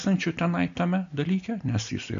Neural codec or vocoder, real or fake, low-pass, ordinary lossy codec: none; real; 7.2 kHz; AAC, 64 kbps